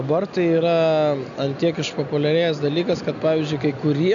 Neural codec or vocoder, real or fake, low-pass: none; real; 7.2 kHz